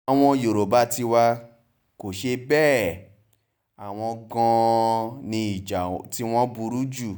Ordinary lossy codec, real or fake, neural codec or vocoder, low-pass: none; real; none; none